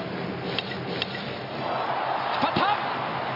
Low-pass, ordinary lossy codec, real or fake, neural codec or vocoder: 5.4 kHz; none; real; none